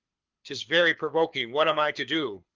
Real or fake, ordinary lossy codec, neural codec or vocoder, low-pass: fake; Opus, 32 kbps; codec, 24 kHz, 6 kbps, HILCodec; 7.2 kHz